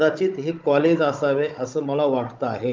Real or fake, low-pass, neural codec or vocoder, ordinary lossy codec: fake; 7.2 kHz; codec, 16 kHz, 16 kbps, FunCodec, trained on Chinese and English, 50 frames a second; Opus, 24 kbps